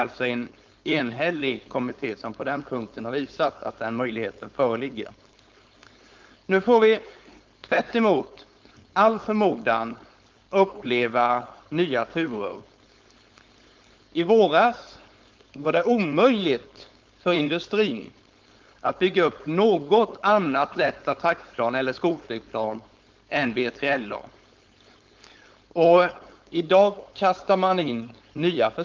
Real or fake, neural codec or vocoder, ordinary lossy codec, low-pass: fake; codec, 16 kHz, 4.8 kbps, FACodec; Opus, 32 kbps; 7.2 kHz